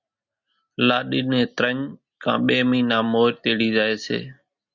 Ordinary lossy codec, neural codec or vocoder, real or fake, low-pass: Opus, 64 kbps; none; real; 7.2 kHz